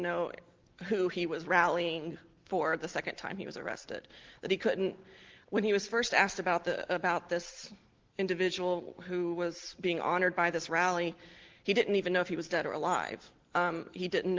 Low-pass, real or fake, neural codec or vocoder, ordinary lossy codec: 7.2 kHz; real; none; Opus, 16 kbps